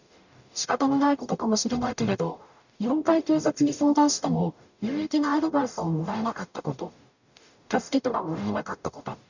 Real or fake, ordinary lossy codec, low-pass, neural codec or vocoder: fake; none; 7.2 kHz; codec, 44.1 kHz, 0.9 kbps, DAC